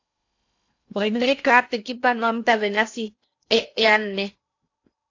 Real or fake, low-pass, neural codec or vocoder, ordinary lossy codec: fake; 7.2 kHz; codec, 16 kHz in and 24 kHz out, 0.8 kbps, FocalCodec, streaming, 65536 codes; AAC, 48 kbps